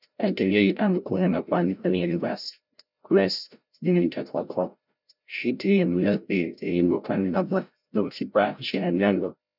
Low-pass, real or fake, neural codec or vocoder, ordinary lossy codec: 5.4 kHz; fake; codec, 16 kHz, 0.5 kbps, FreqCodec, larger model; none